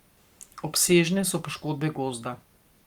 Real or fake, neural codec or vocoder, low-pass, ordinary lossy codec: fake; codec, 44.1 kHz, 7.8 kbps, Pupu-Codec; 19.8 kHz; Opus, 32 kbps